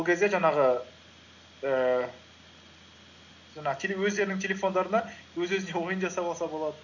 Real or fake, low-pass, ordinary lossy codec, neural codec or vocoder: real; 7.2 kHz; none; none